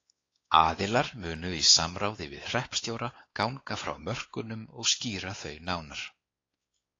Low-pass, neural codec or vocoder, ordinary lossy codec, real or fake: 7.2 kHz; codec, 16 kHz, 4 kbps, X-Codec, WavLM features, trained on Multilingual LibriSpeech; AAC, 32 kbps; fake